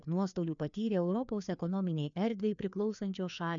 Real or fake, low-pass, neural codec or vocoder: fake; 7.2 kHz; codec, 16 kHz, 2 kbps, FreqCodec, larger model